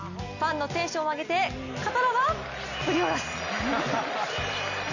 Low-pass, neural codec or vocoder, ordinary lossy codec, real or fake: 7.2 kHz; none; none; real